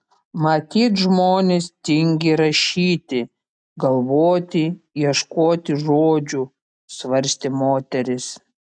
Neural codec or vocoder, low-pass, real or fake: none; 9.9 kHz; real